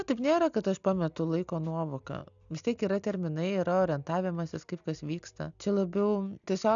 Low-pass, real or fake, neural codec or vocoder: 7.2 kHz; real; none